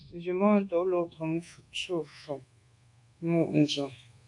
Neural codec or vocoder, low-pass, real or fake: codec, 24 kHz, 1.2 kbps, DualCodec; 10.8 kHz; fake